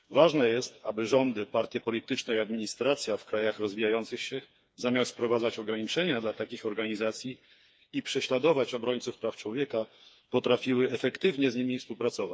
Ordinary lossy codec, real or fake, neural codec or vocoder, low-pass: none; fake; codec, 16 kHz, 4 kbps, FreqCodec, smaller model; none